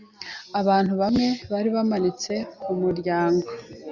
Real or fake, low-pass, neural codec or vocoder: real; 7.2 kHz; none